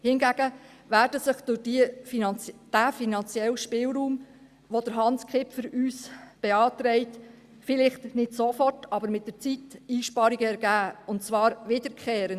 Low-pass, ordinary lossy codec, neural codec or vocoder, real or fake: 14.4 kHz; Opus, 64 kbps; none; real